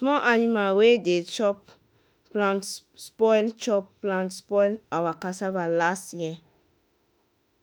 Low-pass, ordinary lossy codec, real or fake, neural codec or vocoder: none; none; fake; autoencoder, 48 kHz, 32 numbers a frame, DAC-VAE, trained on Japanese speech